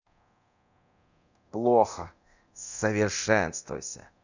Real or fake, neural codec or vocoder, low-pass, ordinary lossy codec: fake; codec, 24 kHz, 0.9 kbps, DualCodec; 7.2 kHz; none